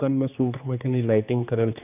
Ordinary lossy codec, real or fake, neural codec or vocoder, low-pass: none; fake; codec, 16 kHz, 2 kbps, X-Codec, HuBERT features, trained on general audio; 3.6 kHz